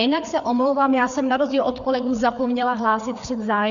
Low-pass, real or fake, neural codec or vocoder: 7.2 kHz; fake; codec, 16 kHz, 4 kbps, FreqCodec, larger model